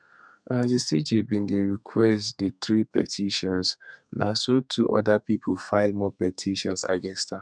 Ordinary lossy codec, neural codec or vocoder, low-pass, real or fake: none; codec, 32 kHz, 1.9 kbps, SNAC; 9.9 kHz; fake